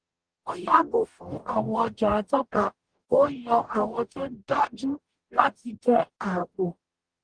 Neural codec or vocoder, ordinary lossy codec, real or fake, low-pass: codec, 44.1 kHz, 0.9 kbps, DAC; Opus, 24 kbps; fake; 9.9 kHz